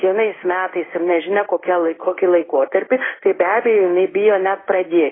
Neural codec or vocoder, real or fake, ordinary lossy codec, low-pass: codec, 16 kHz in and 24 kHz out, 1 kbps, XY-Tokenizer; fake; AAC, 16 kbps; 7.2 kHz